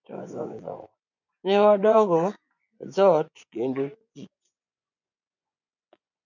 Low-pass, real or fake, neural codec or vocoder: 7.2 kHz; fake; vocoder, 24 kHz, 100 mel bands, Vocos